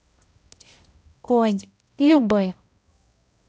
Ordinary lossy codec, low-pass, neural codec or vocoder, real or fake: none; none; codec, 16 kHz, 0.5 kbps, X-Codec, HuBERT features, trained on balanced general audio; fake